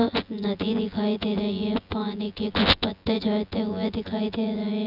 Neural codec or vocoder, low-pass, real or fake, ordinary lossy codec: vocoder, 24 kHz, 100 mel bands, Vocos; 5.4 kHz; fake; none